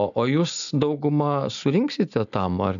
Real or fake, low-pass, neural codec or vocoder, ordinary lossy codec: real; 7.2 kHz; none; MP3, 96 kbps